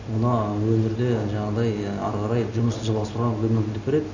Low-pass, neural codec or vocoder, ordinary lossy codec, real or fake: 7.2 kHz; none; none; real